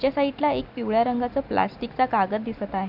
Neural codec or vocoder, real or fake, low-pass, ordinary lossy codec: none; real; 5.4 kHz; AAC, 48 kbps